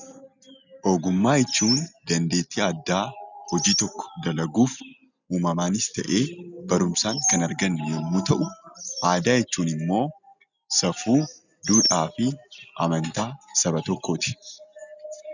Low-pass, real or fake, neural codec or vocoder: 7.2 kHz; real; none